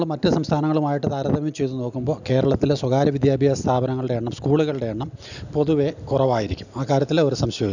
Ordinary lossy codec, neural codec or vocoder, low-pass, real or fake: none; none; 7.2 kHz; real